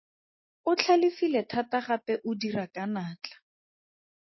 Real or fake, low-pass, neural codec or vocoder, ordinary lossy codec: real; 7.2 kHz; none; MP3, 24 kbps